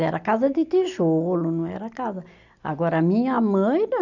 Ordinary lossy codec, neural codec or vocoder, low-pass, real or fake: none; none; 7.2 kHz; real